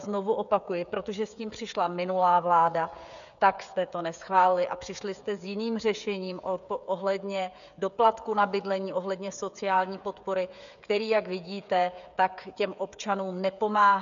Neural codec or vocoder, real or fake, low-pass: codec, 16 kHz, 16 kbps, FreqCodec, smaller model; fake; 7.2 kHz